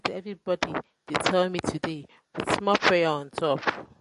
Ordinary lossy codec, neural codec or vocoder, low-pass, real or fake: MP3, 48 kbps; none; 14.4 kHz; real